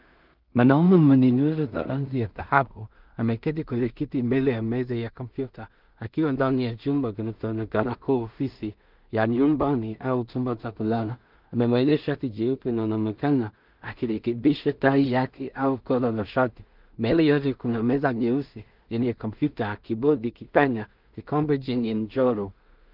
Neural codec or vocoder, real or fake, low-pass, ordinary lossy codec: codec, 16 kHz in and 24 kHz out, 0.4 kbps, LongCat-Audio-Codec, two codebook decoder; fake; 5.4 kHz; Opus, 24 kbps